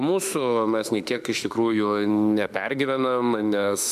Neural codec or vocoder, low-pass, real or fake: autoencoder, 48 kHz, 32 numbers a frame, DAC-VAE, trained on Japanese speech; 14.4 kHz; fake